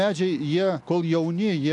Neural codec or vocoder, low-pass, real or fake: none; 10.8 kHz; real